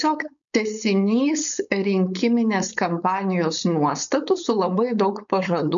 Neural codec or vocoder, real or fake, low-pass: codec, 16 kHz, 4.8 kbps, FACodec; fake; 7.2 kHz